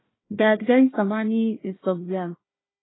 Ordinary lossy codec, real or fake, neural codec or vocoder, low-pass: AAC, 16 kbps; fake; codec, 16 kHz, 1 kbps, FunCodec, trained on Chinese and English, 50 frames a second; 7.2 kHz